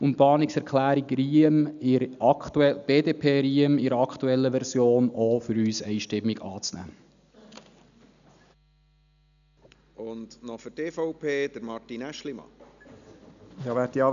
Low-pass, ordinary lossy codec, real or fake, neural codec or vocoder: 7.2 kHz; none; real; none